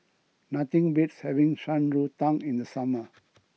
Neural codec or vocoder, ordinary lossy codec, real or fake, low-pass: none; none; real; none